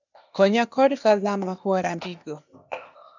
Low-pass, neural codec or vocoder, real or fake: 7.2 kHz; codec, 16 kHz, 0.8 kbps, ZipCodec; fake